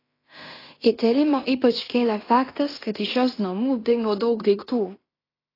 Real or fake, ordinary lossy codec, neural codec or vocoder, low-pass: fake; AAC, 24 kbps; codec, 16 kHz in and 24 kHz out, 0.9 kbps, LongCat-Audio-Codec, fine tuned four codebook decoder; 5.4 kHz